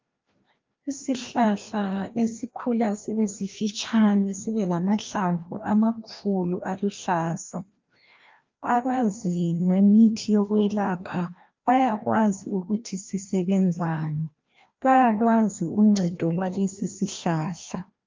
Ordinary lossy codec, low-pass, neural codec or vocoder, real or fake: Opus, 24 kbps; 7.2 kHz; codec, 16 kHz, 1 kbps, FreqCodec, larger model; fake